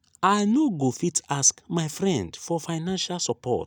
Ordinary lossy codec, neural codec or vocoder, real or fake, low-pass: none; none; real; none